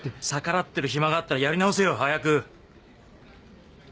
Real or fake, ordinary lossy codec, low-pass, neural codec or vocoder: real; none; none; none